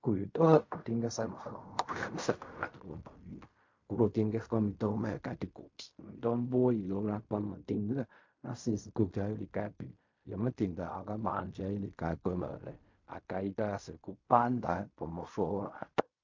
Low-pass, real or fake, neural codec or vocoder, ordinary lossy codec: 7.2 kHz; fake; codec, 16 kHz in and 24 kHz out, 0.4 kbps, LongCat-Audio-Codec, fine tuned four codebook decoder; MP3, 48 kbps